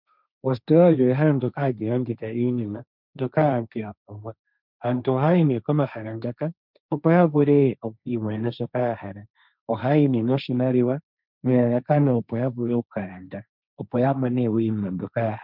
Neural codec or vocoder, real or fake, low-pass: codec, 16 kHz, 1.1 kbps, Voila-Tokenizer; fake; 5.4 kHz